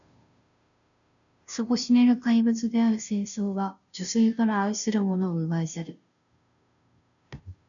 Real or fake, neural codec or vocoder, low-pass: fake; codec, 16 kHz, 0.5 kbps, FunCodec, trained on Chinese and English, 25 frames a second; 7.2 kHz